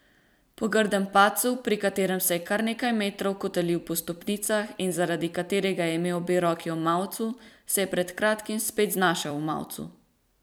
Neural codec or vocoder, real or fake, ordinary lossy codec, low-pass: vocoder, 44.1 kHz, 128 mel bands every 256 samples, BigVGAN v2; fake; none; none